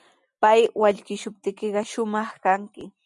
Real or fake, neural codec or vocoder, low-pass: real; none; 10.8 kHz